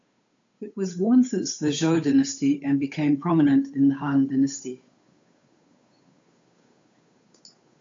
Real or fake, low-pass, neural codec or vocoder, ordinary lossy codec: fake; 7.2 kHz; codec, 16 kHz, 8 kbps, FunCodec, trained on Chinese and English, 25 frames a second; AAC, 48 kbps